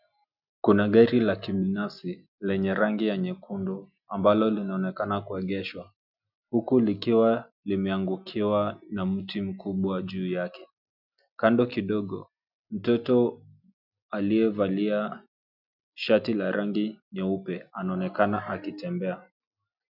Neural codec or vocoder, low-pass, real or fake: none; 5.4 kHz; real